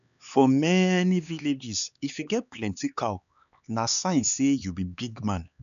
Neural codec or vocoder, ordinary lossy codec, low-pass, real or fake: codec, 16 kHz, 4 kbps, X-Codec, HuBERT features, trained on LibriSpeech; none; 7.2 kHz; fake